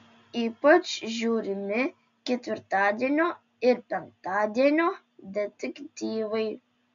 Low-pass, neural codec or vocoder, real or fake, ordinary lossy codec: 7.2 kHz; none; real; MP3, 64 kbps